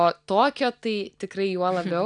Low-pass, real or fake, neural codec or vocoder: 9.9 kHz; real; none